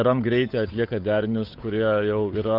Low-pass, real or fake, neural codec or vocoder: 5.4 kHz; fake; codec, 16 kHz, 8 kbps, FunCodec, trained on Chinese and English, 25 frames a second